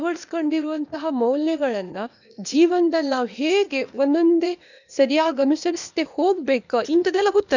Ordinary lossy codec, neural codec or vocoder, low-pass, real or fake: none; codec, 16 kHz, 0.8 kbps, ZipCodec; 7.2 kHz; fake